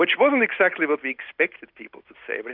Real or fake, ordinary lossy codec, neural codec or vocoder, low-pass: real; Opus, 64 kbps; none; 5.4 kHz